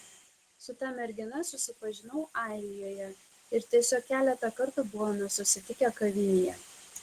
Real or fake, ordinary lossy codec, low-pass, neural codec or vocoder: real; Opus, 16 kbps; 14.4 kHz; none